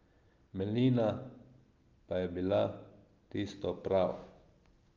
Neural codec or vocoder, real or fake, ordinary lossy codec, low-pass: none; real; Opus, 24 kbps; 7.2 kHz